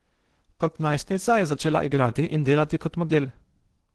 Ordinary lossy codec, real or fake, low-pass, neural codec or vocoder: Opus, 16 kbps; fake; 10.8 kHz; codec, 16 kHz in and 24 kHz out, 0.8 kbps, FocalCodec, streaming, 65536 codes